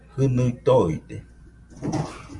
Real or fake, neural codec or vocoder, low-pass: real; none; 10.8 kHz